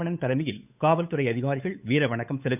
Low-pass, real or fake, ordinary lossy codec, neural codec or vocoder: 3.6 kHz; fake; none; codec, 16 kHz, 8 kbps, FunCodec, trained on Chinese and English, 25 frames a second